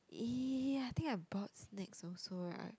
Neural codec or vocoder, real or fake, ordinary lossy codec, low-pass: none; real; none; none